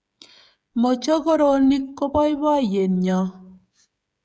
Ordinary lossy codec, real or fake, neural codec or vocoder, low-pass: none; fake; codec, 16 kHz, 16 kbps, FreqCodec, smaller model; none